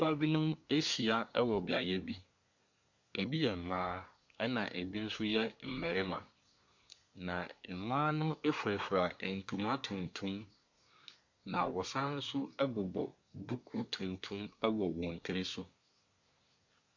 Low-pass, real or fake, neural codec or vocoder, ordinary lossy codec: 7.2 kHz; fake; codec, 24 kHz, 1 kbps, SNAC; MP3, 64 kbps